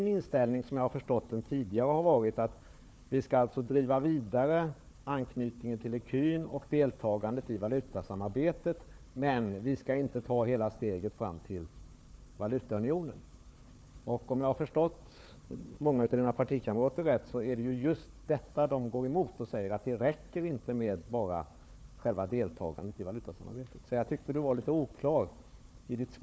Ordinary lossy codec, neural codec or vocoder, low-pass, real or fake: none; codec, 16 kHz, 4 kbps, FunCodec, trained on Chinese and English, 50 frames a second; none; fake